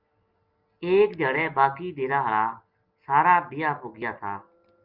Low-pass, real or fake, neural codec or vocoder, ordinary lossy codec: 5.4 kHz; real; none; Opus, 32 kbps